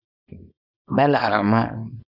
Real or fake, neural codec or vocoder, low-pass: fake; codec, 24 kHz, 0.9 kbps, WavTokenizer, small release; 5.4 kHz